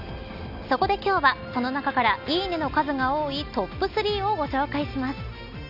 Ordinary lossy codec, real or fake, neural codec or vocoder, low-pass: none; real; none; 5.4 kHz